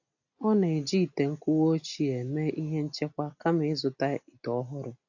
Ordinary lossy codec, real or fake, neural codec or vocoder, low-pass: AAC, 48 kbps; real; none; 7.2 kHz